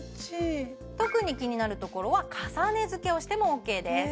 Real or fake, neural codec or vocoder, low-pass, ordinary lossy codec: real; none; none; none